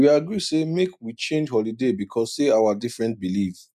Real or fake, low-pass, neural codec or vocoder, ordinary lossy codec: real; 14.4 kHz; none; none